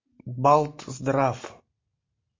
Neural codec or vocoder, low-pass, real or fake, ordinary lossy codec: none; 7.2 kHz; real; MP3, 32 kbps